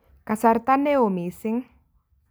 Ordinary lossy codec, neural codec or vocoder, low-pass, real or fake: none; none; none; real